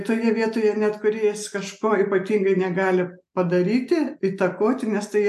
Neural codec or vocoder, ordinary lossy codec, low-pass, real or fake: none; AAC, 96 kbps; 14.4 kHz; real